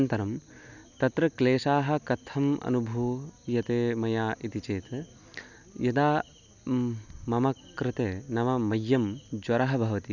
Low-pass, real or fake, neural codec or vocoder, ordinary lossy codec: 7.2 kHz; real; none; none